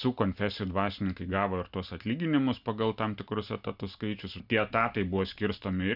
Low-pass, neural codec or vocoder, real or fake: 5.4 kHz; none; real